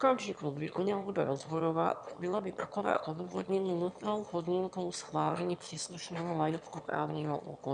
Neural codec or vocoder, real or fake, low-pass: autoencoder, 22.05 kHz, a latent of 192 numbers a frame, VITS, trained on one speaker; fake; 9.9 kHz